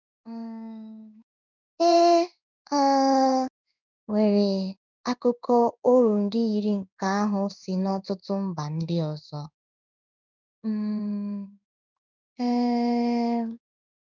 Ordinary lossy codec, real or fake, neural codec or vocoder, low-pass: none; fake; codec, 16 kHz in and 24 kHz out, 1 kbps, XY-Tokenizer; 7.2 kHz